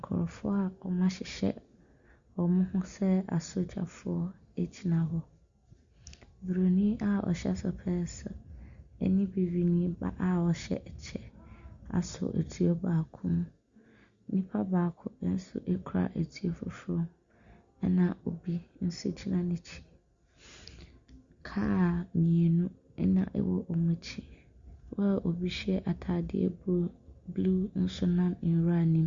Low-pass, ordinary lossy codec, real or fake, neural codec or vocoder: 7.2 kHz; Opus, 64 kbps; real; none